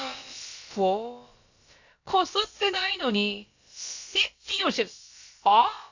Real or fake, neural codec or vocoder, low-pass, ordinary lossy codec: fake; codec, 16 kHz, about 1 kbps, DyCAST, with the encoder's durations; 7.2 kHz; AAC, 48 kbps